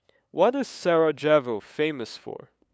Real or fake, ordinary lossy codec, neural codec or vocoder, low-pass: fake; none; codec, 16 kHz, 8 kbps, FunCodec, trained on LibriTTS, 25 frames a second; none